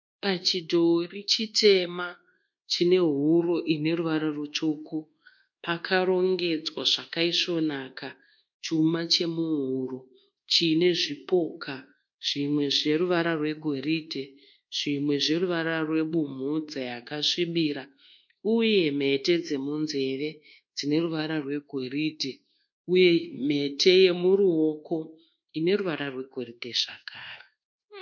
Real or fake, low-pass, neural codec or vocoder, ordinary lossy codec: fake; 7.2 kHz; codec, 24 kHz, 1.2 kbps, DualCodec; MP3, 48 kbps